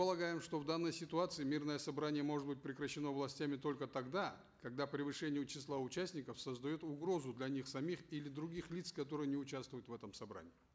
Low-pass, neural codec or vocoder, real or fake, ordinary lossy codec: none; none; real; none